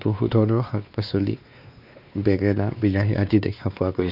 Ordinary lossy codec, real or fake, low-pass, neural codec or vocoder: none; fake; 5.4 kHz; codec, 16 kHz, 2 kbps, X-Codec, WavLM features, trained on Multilingual LibriSpeech